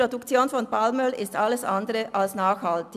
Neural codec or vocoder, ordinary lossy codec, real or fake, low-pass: none; none; real; 14.4 kHz